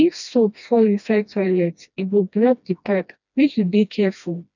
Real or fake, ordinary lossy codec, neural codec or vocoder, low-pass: fake; none; codec, 16 kHz, 1 kbps, FreqCodec, smaller model; 7.2 kHz